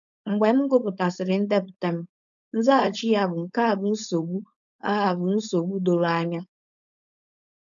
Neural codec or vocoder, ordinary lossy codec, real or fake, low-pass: codec, 16 kHz, 4.8 kbps, FACodec; none; fake; 7.2 kHz